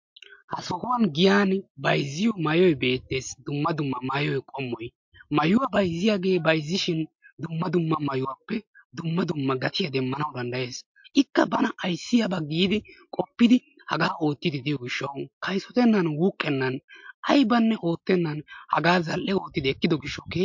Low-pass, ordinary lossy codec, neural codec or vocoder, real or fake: 7.2 kHz; MP3, 48 kbps; none; real